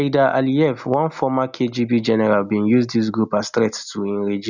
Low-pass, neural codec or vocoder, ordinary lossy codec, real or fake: 7.2 kHz; none; none; real